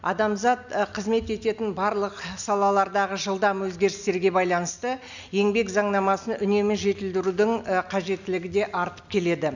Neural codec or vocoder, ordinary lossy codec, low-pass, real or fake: none; none; 7.2 kHz; real